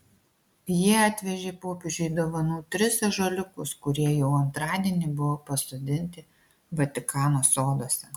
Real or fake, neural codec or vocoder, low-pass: real; none; 19.8 kHz